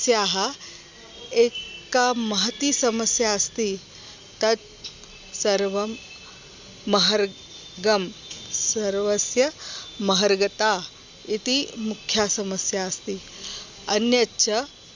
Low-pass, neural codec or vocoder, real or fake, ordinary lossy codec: 7.2 kHz; none; real; Opus, 64 kbps